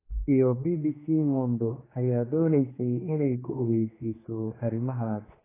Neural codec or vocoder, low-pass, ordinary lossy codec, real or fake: codec, 16 kHz, 2 kbps, X-Codec, HuBERT features, trained on general audio; 3.6 kHz; AAC, 16 kbps; fake